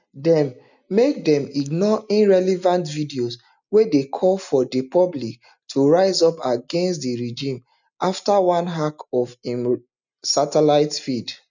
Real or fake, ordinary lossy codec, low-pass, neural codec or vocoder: real; none; 7.2 kHz; none